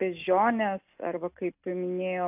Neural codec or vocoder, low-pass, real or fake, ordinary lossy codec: none; 3.6 kHz; real; AAC, 32 kbps